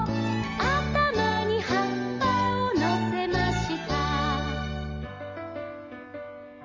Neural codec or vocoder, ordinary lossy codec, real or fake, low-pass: none; Opus, 32 kbps; real; 7.2 kHz